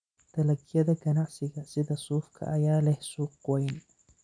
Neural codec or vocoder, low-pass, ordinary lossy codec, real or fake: none; 9.9 kHz; none; real